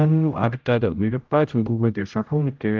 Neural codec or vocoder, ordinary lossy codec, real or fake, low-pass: codec, 16 kHz, 0.5 kbps, X-Codec, HuBERT features, trained on general audio; Opus, 24 kbps; fake; 7.2 kHz